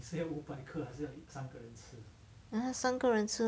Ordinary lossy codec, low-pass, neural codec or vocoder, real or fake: none; none; none; real